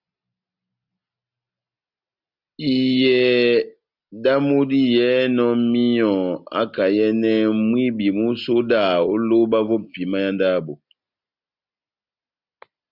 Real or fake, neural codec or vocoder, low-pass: real; none; 5.4 kHz